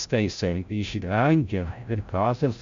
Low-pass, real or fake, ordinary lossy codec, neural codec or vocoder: 7.2 kHz; fake; MP3, 64 kbps; codec, 16 kHz, 0.5 kbps, FreqCodec, larger model